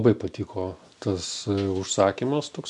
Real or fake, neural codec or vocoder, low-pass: real; none; 10.8 kHz